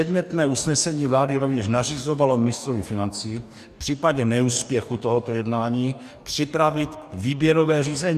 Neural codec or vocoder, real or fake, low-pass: codec, 44.1 kHz, 2.6 kbps, DAC; fake; 14.4 kHz